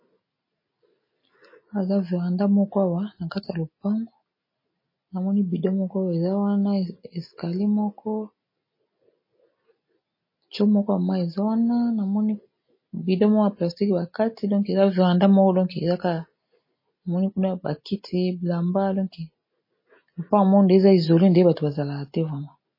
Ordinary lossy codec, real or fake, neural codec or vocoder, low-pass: MP3, 24 kbps; real; none; 5.4 kHz